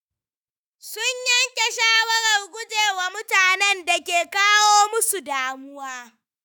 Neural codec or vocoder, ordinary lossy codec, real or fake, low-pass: autoencoder, 48 kHz, 128 numbers a frame, DAC-VAE, trained on Japanese speech; none; fake; none